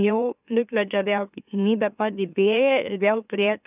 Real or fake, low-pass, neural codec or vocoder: fake; 3.6 kHz; autoencoder, 44.1 kHz, a latent of 192 numbers a frame, MeloTTS